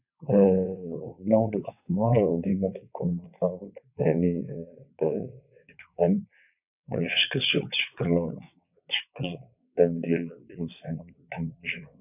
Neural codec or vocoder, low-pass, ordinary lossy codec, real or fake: vocoder, 22.05 kHz, 80 mel bands, WaveNeXt; 3.6 kHz; none; fake